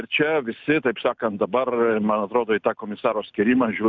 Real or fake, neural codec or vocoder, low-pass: real; none; 7.2 kHz